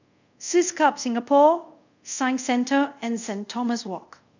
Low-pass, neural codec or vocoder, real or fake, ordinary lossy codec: 7.2 kHz; codec, 24 kHz, 0.5 kbps, DualCodec; fake; none